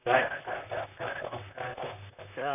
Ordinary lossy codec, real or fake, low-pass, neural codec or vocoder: none; fake; 3.6 kHz; vocoder, 44.1 kHz, 128 mel bands, Pupu-Vocoder